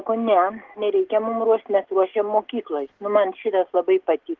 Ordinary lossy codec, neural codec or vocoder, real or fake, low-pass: Opus, 16 kbps; none; real; 7.2 kHz